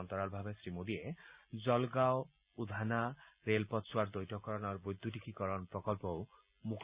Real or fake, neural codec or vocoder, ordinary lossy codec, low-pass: real; none; Opus, 64 kbps; 3.6 kHz